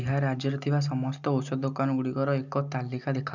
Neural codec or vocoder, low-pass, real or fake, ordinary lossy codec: none; 7.2 kHz; real; none